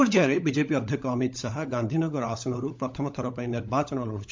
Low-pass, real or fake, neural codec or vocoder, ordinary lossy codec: 7.2 kHz; fake; codec, 16 kHz, 16 kbps, FunCodec, trained on LibriTTS, 50 frames a second; MP3, 64 kbps